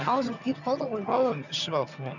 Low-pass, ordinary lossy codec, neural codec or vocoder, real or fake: 7.2 kHz; none; vocoder, 22.05 kHz, 80 mel bands, HiFi-GAN; fake